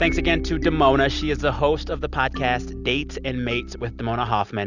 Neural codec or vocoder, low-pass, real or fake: none; 7.2 kHz; real